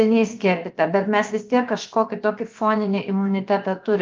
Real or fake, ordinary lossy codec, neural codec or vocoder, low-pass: fake; Opus, 24 kbps; codec, 16 kHz, 0.7 kbps, FocalCodec; 7.2 kHz